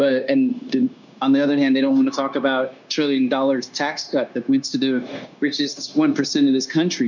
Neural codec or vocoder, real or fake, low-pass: codec, 16 kHz, 0.9 kbps, LongCat-Audio-Codec; fake; 7.2 kHz